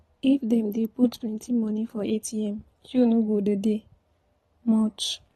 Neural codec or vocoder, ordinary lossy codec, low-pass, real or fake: vocoder, 44.1 kHz, 128 mel bands every 512 samples, BigVGAN v2; AAC, 32 kbps; 19.8 kHz; fake